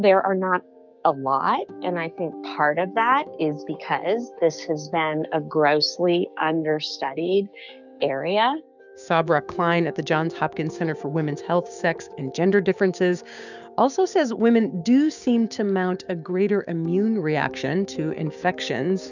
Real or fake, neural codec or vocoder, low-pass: fake; codec, 16 kHz, 6 kbps, DAC; 7.2 kHz